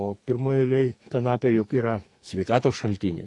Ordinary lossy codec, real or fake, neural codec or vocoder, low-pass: AAC, 48 kbps; fake; codec, 44.1 kHz, 2.6 kbps, SNAC; 10.8 kHz